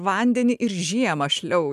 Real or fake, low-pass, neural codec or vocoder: real; 14.4 kHz; none